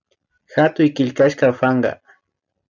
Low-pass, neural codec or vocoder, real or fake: 7.2 kHz; none; real